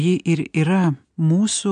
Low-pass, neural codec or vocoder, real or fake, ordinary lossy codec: 9.9 kHz; none; real; AAC, 64 kbps